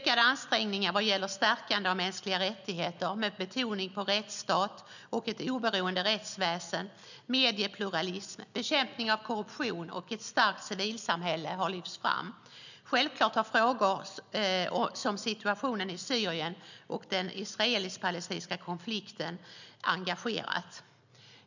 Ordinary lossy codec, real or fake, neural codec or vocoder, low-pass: none; real; none; 7.2 kHz